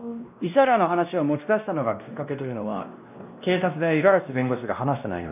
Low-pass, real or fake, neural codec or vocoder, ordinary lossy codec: 3.6 kHz; fake; codec, 16 kHz, 1 kbps, X-Codec, WavLM features, trained on Multilingual LibriSpeech; none